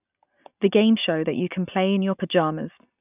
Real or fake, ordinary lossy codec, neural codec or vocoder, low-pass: real; none; none; 3.6 kHz